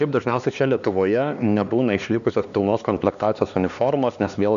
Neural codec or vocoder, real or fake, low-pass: codec, 16 kHz, 2 kbps, X-Codec, HuBERT features, trained on LibriSpeech; fake; 7.2 kHz